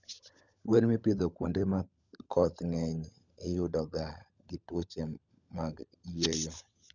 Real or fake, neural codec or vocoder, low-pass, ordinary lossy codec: fake; codec, 16 kHz, 16 kbps, FunCodec, trained on LibriTTS, 50 frames a second; 7.2 kHz; none